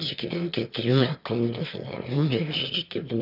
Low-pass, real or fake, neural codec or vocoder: 5.4 kHz; fake; autoencoder, 22.05 kHz, a latent of 192 numbers a frame, VITS, trained on one speaker